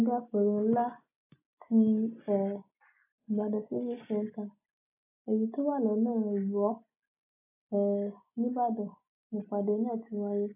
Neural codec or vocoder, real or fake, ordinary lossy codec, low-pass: none; real; AAC, 32 kbps; 3.6 kHz